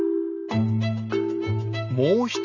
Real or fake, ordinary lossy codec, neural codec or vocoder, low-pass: real; none; none; 7.2 kHz